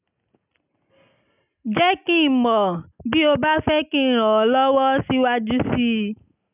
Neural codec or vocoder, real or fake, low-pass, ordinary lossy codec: none; real; 3.6 kHz; none